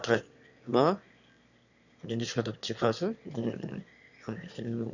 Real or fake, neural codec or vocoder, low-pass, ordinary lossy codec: fake; autoencoder, 22.05 kHz, a latent of 192 numbers a frame, VITS, trained on one speaker; 7.2 kHz; none